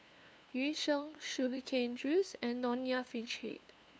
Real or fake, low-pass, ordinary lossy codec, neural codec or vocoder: fake; none; none; codec, 16 kHz, 2 kbps, FunCodec, trained on LibriTTS, 25 frames a second